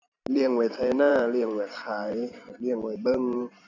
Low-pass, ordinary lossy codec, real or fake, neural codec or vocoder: 7.2 kHz; none; fake; vocoder, 44.1 kHz, 128 mel bands every 512 samples, BigVGAN v2